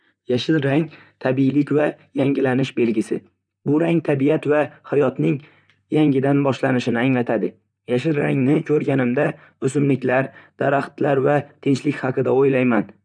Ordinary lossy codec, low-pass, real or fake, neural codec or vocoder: none; 9.9 kHz; fake; vocoder, 44.1 kHz, 128 mel bands, Pupu-Vocoder